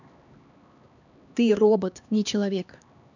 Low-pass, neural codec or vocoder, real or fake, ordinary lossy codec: 7.2 kHz; codec, 16 kHz, 2 kbps, X-Codec, HuBERT features, trained on LibriSpeech; fake; none